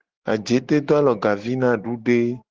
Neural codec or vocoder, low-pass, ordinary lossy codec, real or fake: none; 7.2 kHz; Opus, 16 kbps; real